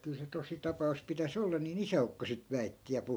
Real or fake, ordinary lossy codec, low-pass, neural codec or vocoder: real; none; none; none